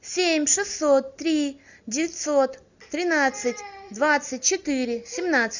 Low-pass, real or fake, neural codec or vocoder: 7.2 kHz; real; none